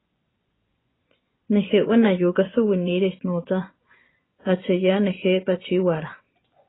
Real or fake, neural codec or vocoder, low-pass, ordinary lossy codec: fake; vocoder, 24 kHz, 100 mel bands, Vocos; 7.2 kHz; AAC, 16 kbps